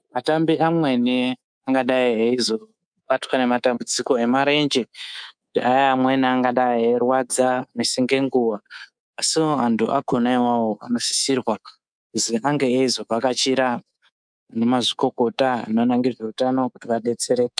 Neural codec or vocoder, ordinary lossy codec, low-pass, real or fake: codec, 24 kHz, 3.1 kbps, DualCodec; MP3, 96 kbps; 9.9 kHz; fake